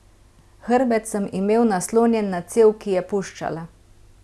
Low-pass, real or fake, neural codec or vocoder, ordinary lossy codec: none; real; none; none